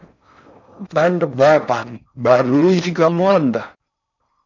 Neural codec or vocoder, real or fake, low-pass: codec, 16 kHz in and 24 kHz out, 0.6 kbps, FocalCodec, streaming, 4096 codes; fake; 7.2 kHz